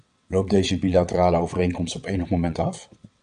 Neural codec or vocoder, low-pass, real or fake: vocoder, 22.05 kHz, 80 mel bands, WaveNeXt; 9.9 kHz; fake